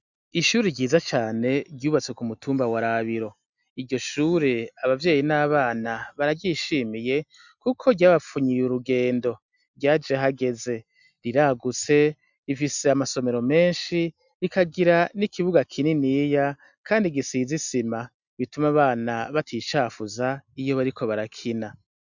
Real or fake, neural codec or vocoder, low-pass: real; none; 7.2 kHz